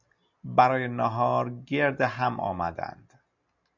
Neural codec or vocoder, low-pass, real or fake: none; 7.2 kHz; real